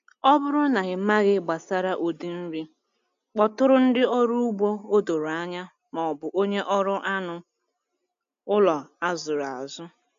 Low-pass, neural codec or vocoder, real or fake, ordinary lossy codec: 7.2 kHz; none; real; AAC, 64 kbps